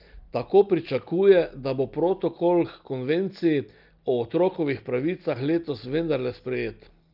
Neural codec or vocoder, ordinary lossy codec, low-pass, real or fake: none; Opus, 24 kbps; 5.4 kHz; real